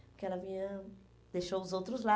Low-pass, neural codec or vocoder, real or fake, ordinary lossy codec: none; none; real; none